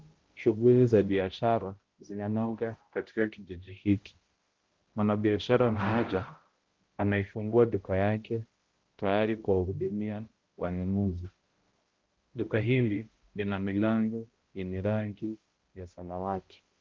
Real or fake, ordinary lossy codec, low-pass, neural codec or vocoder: fake; Opus, 16 kbps; 7.2 kHz; codec, 16 kHz, 0.5 kbps, X-Codec, HuBERT features, trained on balanced general audio